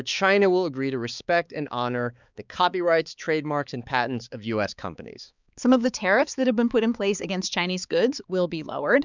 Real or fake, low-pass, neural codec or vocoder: fake; 7.2 kHz; codec, 16 kHz, 4 kbps, X-Codec, HuBERT features, trained on balanced general audio